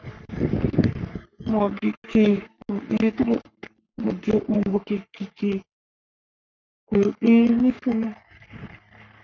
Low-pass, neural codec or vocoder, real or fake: 7.2 kHz; codec, 44.1 kHz, 3.4 kbps, Pupu-Codec; fake